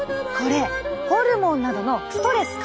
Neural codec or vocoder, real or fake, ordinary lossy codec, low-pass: none; real; none; none